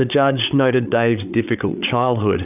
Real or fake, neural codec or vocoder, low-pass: fake; codec, 16 kHz, 8 kbps, FreqCodec, larger model; 3.6 kHz